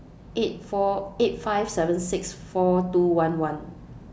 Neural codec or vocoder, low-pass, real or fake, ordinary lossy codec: none; none; real; none